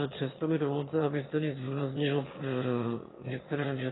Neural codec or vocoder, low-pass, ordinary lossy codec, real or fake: autoencoder, 22.05 kHz, a latent of 192 numbers a frame, VITS, trained on one speaker; 7.2 kHz; AAC, 16 kbps; fake